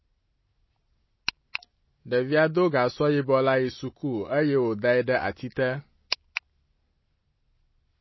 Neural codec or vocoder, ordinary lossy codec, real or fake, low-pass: none; MP3, 24 kbps; real; 7.2 kHz